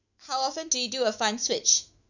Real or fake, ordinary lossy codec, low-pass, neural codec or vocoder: fake; none; 7.2 kHz; autoencoder, 48 kHz, 128 numbers a frame, DAC-VAE, trained on Japanese speech